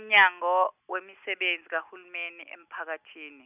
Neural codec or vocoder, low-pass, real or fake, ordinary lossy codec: none; 3.6 kHz; real; none